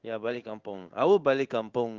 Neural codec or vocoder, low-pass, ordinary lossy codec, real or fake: codec, 16 kHz in and 24 kHz out, 1 kbps, XY-Tokenizer; 7.2 kHz; Opus, 32 kbps; fake